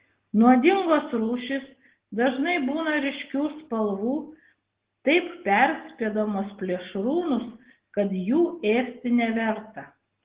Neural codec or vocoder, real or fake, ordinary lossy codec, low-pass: none; real; Opus, 16 kbps; 3.6 kHz